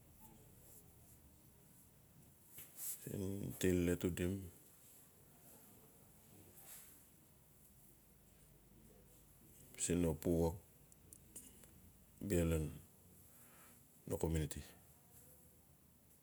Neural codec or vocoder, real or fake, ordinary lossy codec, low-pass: none; real; none; none